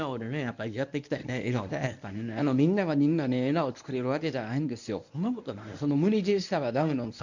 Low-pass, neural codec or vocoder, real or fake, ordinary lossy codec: 7.2 kHz; codec, 24 kHz, 0.9 kbps, WavTokenizer, medium speech release version 1; fake; none